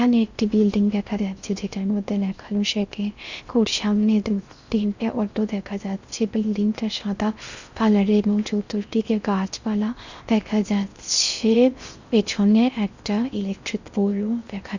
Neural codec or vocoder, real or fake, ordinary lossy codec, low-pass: codec, 16 kHz in and 24 kHz out, 0.6 kbps, FocalCodec, streaming, 4096 codes; fake; Opus, 64 kbps; 7.2 kHz